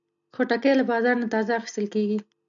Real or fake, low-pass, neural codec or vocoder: real; 7.2 kHz; none